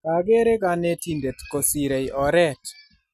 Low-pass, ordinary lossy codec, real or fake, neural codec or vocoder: 14.4 kHz; none; real; none